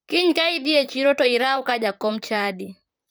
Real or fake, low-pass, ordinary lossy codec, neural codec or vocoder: fake; none; none; vocoder, 44.1 kHz, 128 mel bands, Pupu-Vocoder